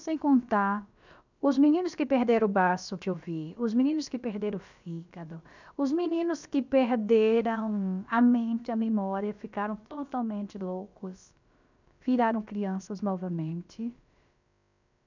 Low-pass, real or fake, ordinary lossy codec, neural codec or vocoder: 7.2 kHz; fake; none; codec, 16 kHz, about 1 kbps, DyCAST, with the encoder's durations